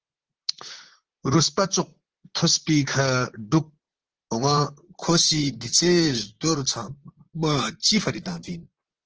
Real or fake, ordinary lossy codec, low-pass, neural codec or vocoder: fake; Opus, 16 kbps; 7.2 kHz; vocoder, 44.1 kHz, 128 mel bands, Pupu-Vocoder